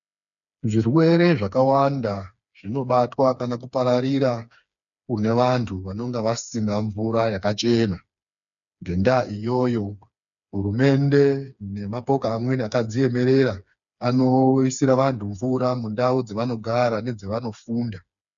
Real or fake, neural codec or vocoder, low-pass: fake; codec, 16 kHz, 4 kbps, FreqCodec, smaller model; 7.2 kHz